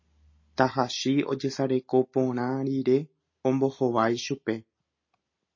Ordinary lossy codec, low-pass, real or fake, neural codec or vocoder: MP3, 32 kbps; 7.2 kHz; real; none